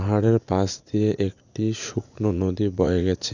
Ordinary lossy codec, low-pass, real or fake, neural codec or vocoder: none; 7.2 kHz; fake; vocoder, 44.1 kHz, 80 mel bands, Vocos